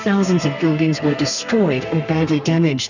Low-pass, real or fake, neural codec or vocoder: 7.2 kHz; fake; codec, 32 kHz, 1.9 kbps, SNAC